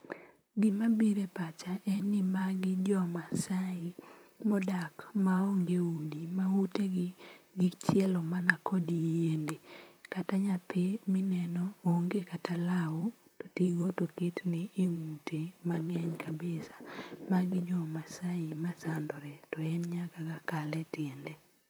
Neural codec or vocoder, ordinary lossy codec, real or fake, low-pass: vocoder, 44.1 kHz, 128 mel bands, Pupu-Vocoder; none; fake; none